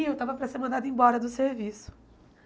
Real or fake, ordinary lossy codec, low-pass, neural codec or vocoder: real; none; none; none